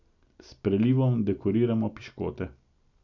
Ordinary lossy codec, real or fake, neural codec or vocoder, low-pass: none; real; none; 7.2 kHz